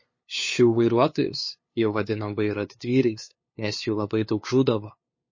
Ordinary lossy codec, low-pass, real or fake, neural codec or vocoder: MP3, 32 kbps; 7.2 kHz; fake; codec, 16 kHz, 2 kbps, FunCodec, trained on LibriTTS, 25 frames a second